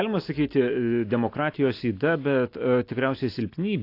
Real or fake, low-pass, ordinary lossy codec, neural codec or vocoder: real; 5.4 kHz; AAC, 32 kbps; none